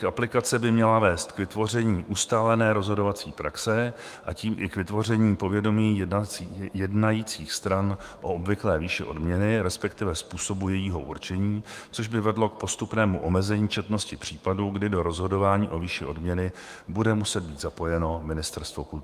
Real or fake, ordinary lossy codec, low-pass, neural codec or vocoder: fake; Opus, 32 kbps; 14.4 kHz; autoencoder, 48 kHz, 128 numbers a frame, DAC-VAE, trained on Japanese speech